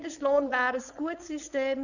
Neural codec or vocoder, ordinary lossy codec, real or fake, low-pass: codec, 16 kHz, 4.8 kbps, FACodec; none; fake; 7.2 kHz